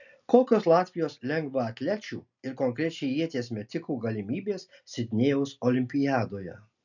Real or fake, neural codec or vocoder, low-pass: real; none; 7.2 kHz